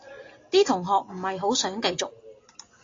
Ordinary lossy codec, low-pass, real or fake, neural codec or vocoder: AAC, 32 kbps; 7.2 kHz; real; none